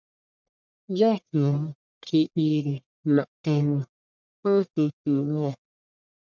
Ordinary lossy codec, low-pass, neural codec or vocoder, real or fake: MP3, 64 kbps; 7.2 kHz; codec, 44.1 kHz, 1.7 kbps, Pupu-Codec; fake